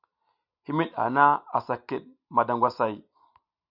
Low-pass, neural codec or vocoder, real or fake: 5.4 kHz; none; real